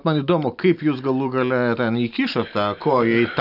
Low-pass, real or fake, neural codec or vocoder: 5.4 kHz; real; none